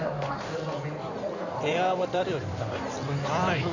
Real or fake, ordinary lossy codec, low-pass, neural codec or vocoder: fake; none; 7.2 kHz; codec, 16 kHz in and 24 kHz out, 2.2 kbps, FireRedTTS-2 codec